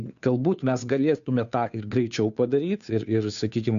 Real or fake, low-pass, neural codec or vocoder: fake; 7.2 kHz; codec, 16 kHz, 2 kbps, FunCodec, trained on Chinese and English, 25 frames a second